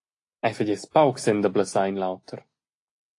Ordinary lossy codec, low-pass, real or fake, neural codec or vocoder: AAC, 48 kbps; 10.8 kHz; real; none